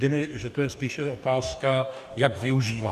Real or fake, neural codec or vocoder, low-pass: fake; codec, 44.1 kHz, 2.6 kbps, DAC; 14.4 kHz